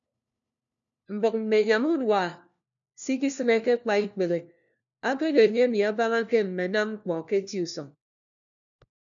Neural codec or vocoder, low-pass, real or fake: codec, 16 kHz, 1 kbps, FunCodec, trained on LibriTTS, 50 frames a second; 7.2 kHz; fake